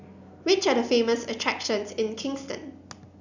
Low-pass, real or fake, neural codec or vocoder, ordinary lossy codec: 7.2 kHz; real; none; none